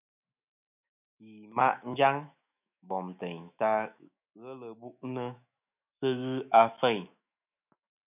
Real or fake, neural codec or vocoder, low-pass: fake; autoencoder, 48 kHz, 128 numbers a frame, DAC-VAE, trained on Japanese speech; 3.6 kHz